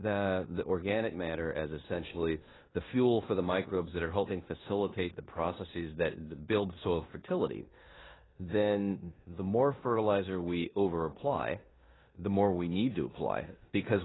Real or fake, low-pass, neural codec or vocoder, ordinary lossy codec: fake; 7.2 kHz; codec, 16 kHz in and 24 kHz out, 0.9 kbps, LongCat-Audio-Codec, four codebook decoder; AAC, 16 kbps